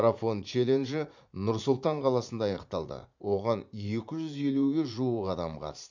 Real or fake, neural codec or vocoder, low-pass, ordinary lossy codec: fake; vocoder, 44.1 kHz, 80 mel bands, Vocos; 7.2 kHz; none